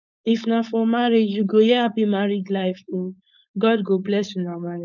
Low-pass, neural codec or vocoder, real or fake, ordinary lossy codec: 7.2 kHz; codec, 16 kHz, 4.8 kbps, FACodec; fake; none